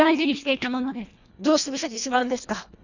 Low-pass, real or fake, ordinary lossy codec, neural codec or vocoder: 7.2 kHz; fake; none; codec, 24 kHz, 1.5 kbps, HILCodec